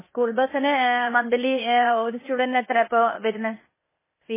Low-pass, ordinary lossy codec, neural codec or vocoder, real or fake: 3.6 kHz; MP3, 16 kbps; codec, 16 kHz, 0.8 kbps, ZipCodec; fake